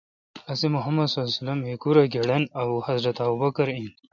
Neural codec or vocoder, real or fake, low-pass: none; real; 7.2 kHz